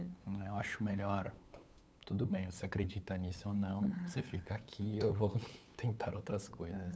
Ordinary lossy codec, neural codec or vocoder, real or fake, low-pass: none; codec, 16 kHz, 8 kbps, FunCodec, trained on LibriTTS, 25 frames a second; fake; none